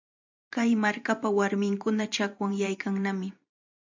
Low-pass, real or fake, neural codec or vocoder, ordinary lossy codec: 7.2 kHz; fake; vocoder, 44.1 kHz, 128 mel bands every 512 samples, BigVGAN v2; MP3, 64 kbps